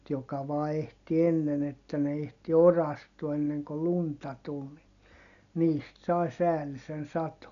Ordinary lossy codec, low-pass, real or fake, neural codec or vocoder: none; 7.2 kHz; real; none